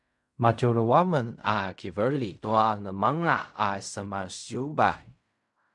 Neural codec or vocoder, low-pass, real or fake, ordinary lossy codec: codec, 16 kHz in and 24 kHz out, 0.4 kbps, LongCat-Audio-Codec, fine tuned four codebook decoder; 10.8 kHz; fake; MP3, 96 kbps